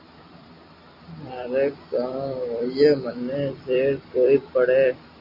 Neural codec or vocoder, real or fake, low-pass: vocoder, 44.1 kHz, 128 mel bands every 256 samples, BigVGAN v2; fake; 5.4 kHz